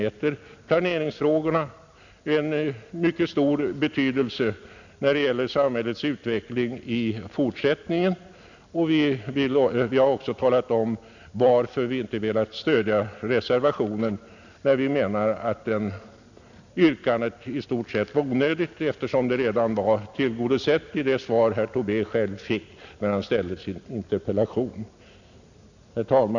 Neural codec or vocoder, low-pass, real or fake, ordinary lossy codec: none; 7.2 kHz; real; none